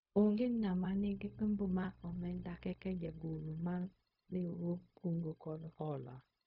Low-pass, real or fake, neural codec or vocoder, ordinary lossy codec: 5.4 kHz; fake; codec, 16 kHz, 0.4 kbps, LongCat-Audio-Codec; none